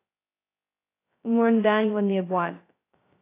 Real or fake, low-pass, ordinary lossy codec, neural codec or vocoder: fake; 3.6 kHz; AAC, 24 kbps; codec, 16 kHz, 0.2 kbps, FocalCodec